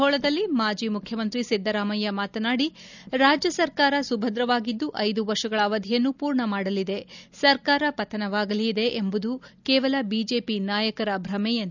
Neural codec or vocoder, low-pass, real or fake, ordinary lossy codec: none; 7.2 kHz; real; none